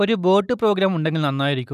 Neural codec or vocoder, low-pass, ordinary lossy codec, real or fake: none; 14.4 kHz; none; real